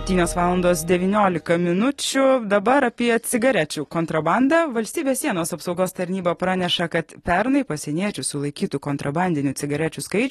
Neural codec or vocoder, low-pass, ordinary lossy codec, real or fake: none; 14.4 kHz; AAC, 32 kbps; real